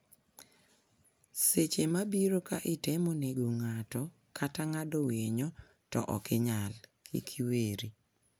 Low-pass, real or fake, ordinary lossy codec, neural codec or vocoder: none; real; none; none